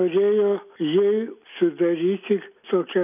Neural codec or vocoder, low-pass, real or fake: none; 3.6 kHz; real